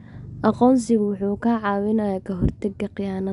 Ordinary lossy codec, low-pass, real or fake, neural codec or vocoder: none; 10.8 kHz; real; none